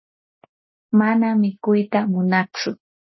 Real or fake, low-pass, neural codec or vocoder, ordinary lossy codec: real; 7.2 kHz; none; MP3, 24 kbps